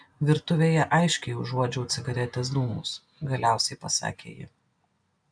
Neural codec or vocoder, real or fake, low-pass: none; real; 9.9 kHz